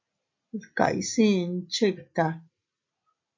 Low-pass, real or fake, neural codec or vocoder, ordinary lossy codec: 7.2 kHz; real; none; MP3, 48 kbps